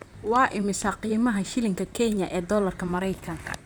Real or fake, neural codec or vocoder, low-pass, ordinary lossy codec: fake; vocoder, 44.1 kHz, 128 mel bands, Pupu-Vocoder; none; none